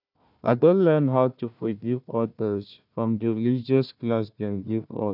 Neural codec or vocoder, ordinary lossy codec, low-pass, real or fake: codec, 16 kHz, 1 kbps, FunCodec, trained on Chinese and English, 50 frames a second; none; 5.4 kHz; fake